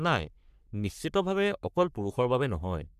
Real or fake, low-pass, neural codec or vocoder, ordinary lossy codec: fake; 14.4 kHz; codec, 44.1 kHz, 3.4 kbps, Pupu-Codec; none